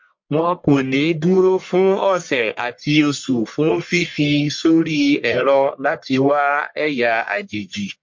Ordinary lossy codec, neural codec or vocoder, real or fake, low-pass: MP3, 48 kbps; codec, 44.1 kHz, 1.7 kbps, Pupu-Codec; fake; 7.2 kHz